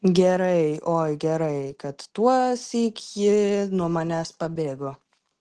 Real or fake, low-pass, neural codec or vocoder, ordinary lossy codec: real; 10.8 kHz; none; Opus, 16 kbps